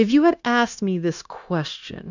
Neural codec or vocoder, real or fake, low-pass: codec, 16 kHz, 0.9 kbps, LongCat-Audio-Codec; fake; 7.2 kHz